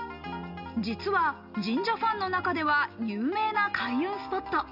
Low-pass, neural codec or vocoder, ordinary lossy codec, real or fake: 5.4 kHz; none; none; real